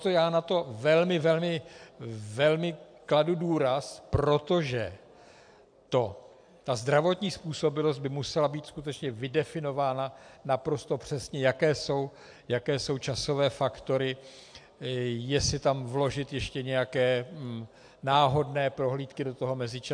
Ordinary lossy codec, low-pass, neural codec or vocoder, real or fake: AAC, 64 kbps; 9.9 kHz; none; real